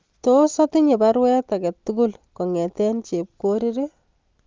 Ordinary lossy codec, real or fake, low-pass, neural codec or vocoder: Opus, 32 kbps; real; 7.2 kHz; none